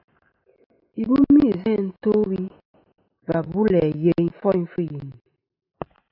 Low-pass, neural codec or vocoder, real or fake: 5.4 kHz; none; real